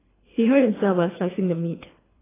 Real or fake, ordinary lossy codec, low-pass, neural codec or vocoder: fake; AAC, 16 kbps; 3.6 kHz; codec, 24 kHz, 3 kbps, HILCodec